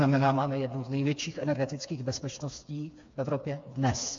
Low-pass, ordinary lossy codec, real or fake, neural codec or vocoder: 7.2 kHz; AAC, 48 kbps; fake; codec, 16 kHz, 4 kbps, FreqCodec, smaller model